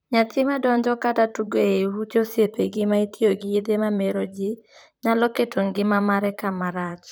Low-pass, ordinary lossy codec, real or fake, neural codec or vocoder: none; none; fake; vocoder, 44.1 kHz, 128 mel bands, Pupu-Vocoder